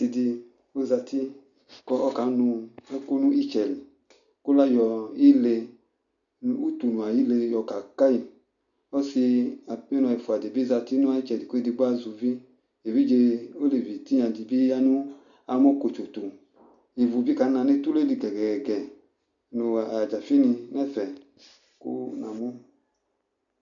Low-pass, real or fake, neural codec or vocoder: 7.2 kHz; real; none